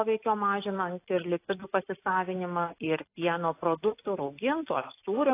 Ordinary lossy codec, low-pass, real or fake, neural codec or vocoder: AAC, 24 kbps; 3.6 kHz; real; none